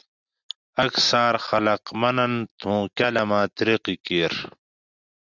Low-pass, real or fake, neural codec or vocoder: 7.2 kHz; real; none